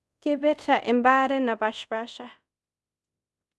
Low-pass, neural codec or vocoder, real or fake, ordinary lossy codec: none; codec, 24 kHz, 0.5 kbps, DualCodec; fake; none